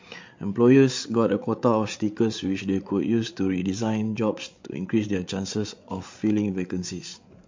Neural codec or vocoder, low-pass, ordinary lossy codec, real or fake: codec, 16 kHz, 8 kbps, FreqCodec, larger model; 7.2 kHz; MP3, 48 kbps; fake